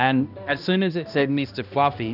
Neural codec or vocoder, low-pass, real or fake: codec, 16 kHz, 1 kbps, X-Codec, HuBERT features, trained on balanced general audio; 5.4 kHz; fake